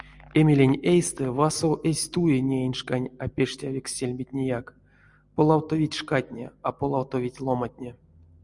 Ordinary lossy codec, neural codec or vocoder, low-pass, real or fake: Opus, 64 kbps; none; 10.8 kHz; real